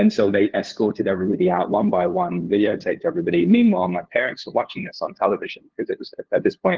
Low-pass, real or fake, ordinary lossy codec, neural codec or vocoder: 7.2 kHz; fake; Opus, 16 kbps; codec, 16 kHz, 2 kbps, FunCodec, trained on LibriTTS, 25 frames a second